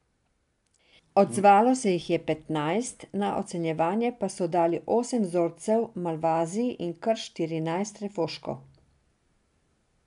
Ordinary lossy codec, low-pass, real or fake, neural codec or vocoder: none; 10.8 kHz; real; none